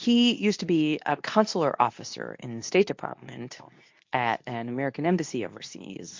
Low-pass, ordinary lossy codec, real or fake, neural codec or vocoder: 7.2 kHz; MP3, 48 kbps; fake; codec, 24 kHz, 0.9 kbps, WavTokenizer, medium speech release version 2